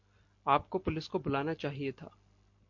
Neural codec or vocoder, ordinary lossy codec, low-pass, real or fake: none; MP3, 48 kbps; 7.2 kHz; real